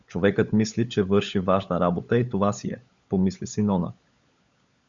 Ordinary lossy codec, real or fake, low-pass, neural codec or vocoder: Opus, 64 kbps; fake; 7.2 kHz; codec, 16 kHz, 16 kbps, FunCodec, trained on LibriTTS, 50 frames a second